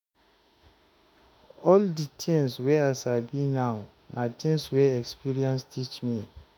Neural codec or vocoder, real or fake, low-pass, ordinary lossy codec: autoencoder, 48 kHz, 32 numbers a frame, DAC-VAE, trained on Japanese speech; fake; none; none